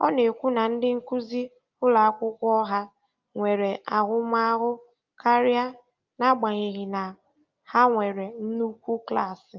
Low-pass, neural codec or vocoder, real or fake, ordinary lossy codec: 7.2 kHz; none; real; Opus, 24 kbps